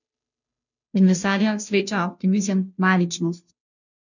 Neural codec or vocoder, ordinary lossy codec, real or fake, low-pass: codec, 16 kHz, 0.5 kbps, FunCodec, trained on Chinese and English, 25 frames a second; none; fake; 7.2 kHz